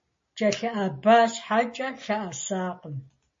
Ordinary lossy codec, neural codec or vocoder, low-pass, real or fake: MP3, 32 kbps; none; 7.2 kHz; real